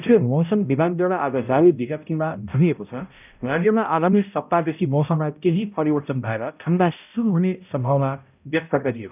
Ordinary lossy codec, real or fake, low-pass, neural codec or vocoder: none; fake; 3.6 kHz; codec, 16 kHz, 0.5 kbps, X-Codec, HuBERT features, trained on balanced general audio